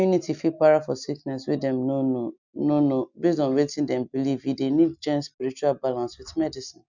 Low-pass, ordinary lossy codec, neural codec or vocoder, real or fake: 7.2 kHz; none; none; real